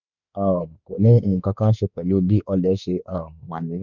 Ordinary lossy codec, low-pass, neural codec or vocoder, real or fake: none; 7.2 kHz; autoencoder, 48 kHz, 32 numbers a frame, DAC-VAE, trained on Japanese speech; fake